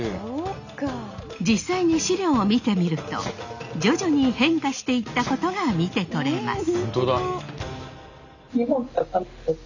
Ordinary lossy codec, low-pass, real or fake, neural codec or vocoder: none; 7.2 kHz; real; none